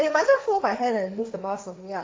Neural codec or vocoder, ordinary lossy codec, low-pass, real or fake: codec, 16 kHz, 1.1 kbps, Voila-Tokenizer; none; none; fake